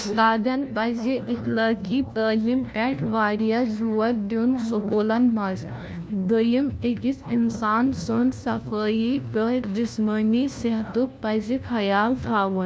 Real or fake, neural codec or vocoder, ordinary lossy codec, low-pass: fake; codec, 16 kHz, 1 kbps, FunCodec, trained on LibriTTS, 50 frames a second; none; none